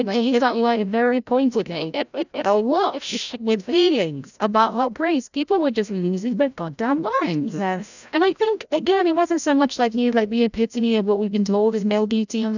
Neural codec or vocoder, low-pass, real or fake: codec, 16 kHz, 0.5 kbps, FreqCodec, larger model; 7.2 kHz; fake